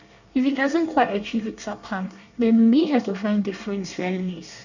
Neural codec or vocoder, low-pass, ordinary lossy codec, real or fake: codec, 24 kHz, 1 kbps, SNAC; 7.2 kHz; none; fake